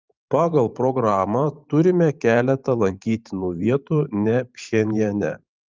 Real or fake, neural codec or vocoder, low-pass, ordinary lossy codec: fake; vocoder, 44.1 kHz, 128 mel bands every 512 samples, BigVGAN v2; 7.2 kHz; Opus, 24 kbps